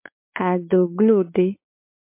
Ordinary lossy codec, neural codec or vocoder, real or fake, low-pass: MP3, 32 kbps; codec, 16 kHz, 4 kbps, X-Codec, WavLM features, trained on Multilingual LibriSpeech; fake; 3.6 kHz